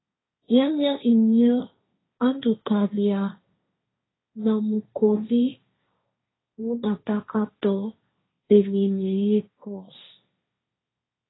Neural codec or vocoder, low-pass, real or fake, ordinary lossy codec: codec, 16 kHz, 1.1 kbps, Voila-Tokenizer; 7.2 kHz; fake; AAC, 16 kbps